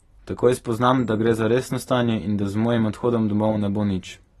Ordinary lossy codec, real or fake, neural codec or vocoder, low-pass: AAC, 32 kbps; real; none; 19.8 kHz